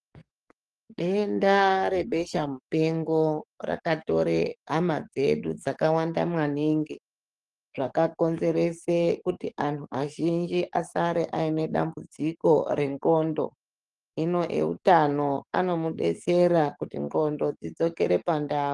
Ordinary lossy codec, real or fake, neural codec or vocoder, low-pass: Opus, 24 kbps; fake; codec, 44.1 kHz, 7.8 kbps, DAC; 10.8 kHz